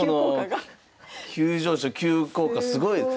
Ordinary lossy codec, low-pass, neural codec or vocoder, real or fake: none; none; none; real